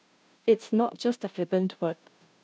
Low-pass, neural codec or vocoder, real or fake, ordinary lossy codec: none; codec, 16 kHz, 0.5 kbps, FunCodec, trained on Chinese and English, 25 frames a second; fake; none